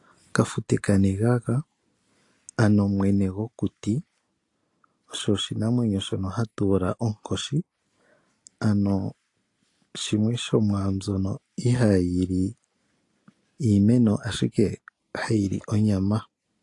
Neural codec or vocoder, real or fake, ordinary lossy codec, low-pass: none; real; AAC, 48 kbps; 10.8 kHz